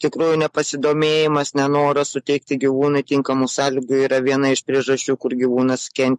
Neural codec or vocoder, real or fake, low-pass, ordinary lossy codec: none; real; 14.4 kHz; MP3, 48 kbps